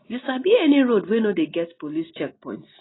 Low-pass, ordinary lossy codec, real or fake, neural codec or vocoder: 7.2 kHz; AAC, 16 kbps; real; none